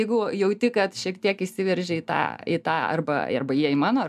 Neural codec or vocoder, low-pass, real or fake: none; 14.4 kHz; real